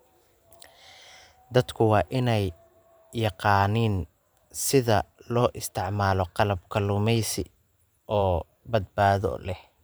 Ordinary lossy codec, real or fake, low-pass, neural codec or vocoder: none; fake; none; vocoder, 44.1 kHz, 128 mel bands every 512 samples, BigVGAN v2